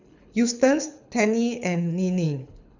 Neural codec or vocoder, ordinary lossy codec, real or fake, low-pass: codec, 24 kHz, 6 kbps, HILCodec; none; fake; 7.2 kHz